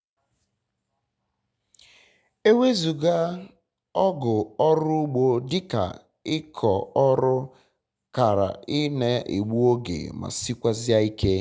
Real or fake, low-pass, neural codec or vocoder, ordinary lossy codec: real; none; none; none